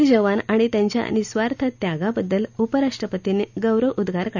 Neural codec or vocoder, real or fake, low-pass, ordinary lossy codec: none; real; 7.2 kHz; none